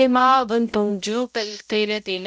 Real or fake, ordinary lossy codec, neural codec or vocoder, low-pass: fake; none; codec, 16 kHz, 0.5 kbps, X-Codec, HuBERT features, trained on balanced general audio; none